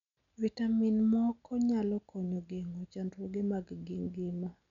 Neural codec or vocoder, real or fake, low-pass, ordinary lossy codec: none; real; 7.2 kHz; none